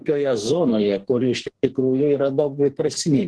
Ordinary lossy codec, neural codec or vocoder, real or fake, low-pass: Opus, 16 kbps; codec, 44.1 kHz, 2.6 kbps, SNAC; fake; 10.8 kHz